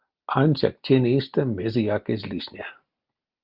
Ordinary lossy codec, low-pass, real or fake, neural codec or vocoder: Opus, 32 kbps; 5.4 kHz; real; none